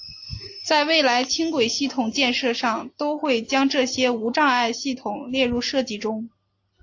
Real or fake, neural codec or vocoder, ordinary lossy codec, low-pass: real; none; AAC, 48 kbps; 7.2 kHz